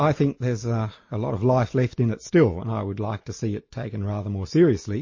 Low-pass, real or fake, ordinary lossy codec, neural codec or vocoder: 7.2 kHz; real; MP3, 32 kbps; none